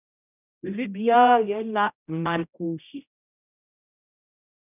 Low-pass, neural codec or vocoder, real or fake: 3.6 kHz; codec, 16 kHz, 0.5 kbps, X-Codec, HuBERT features, trained on general audio; fake